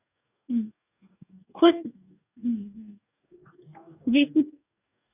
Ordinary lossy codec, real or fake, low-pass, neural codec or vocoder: none; fake; 3.6 kHz; codec, 44.1 kHz, 2.6 kbps, DAC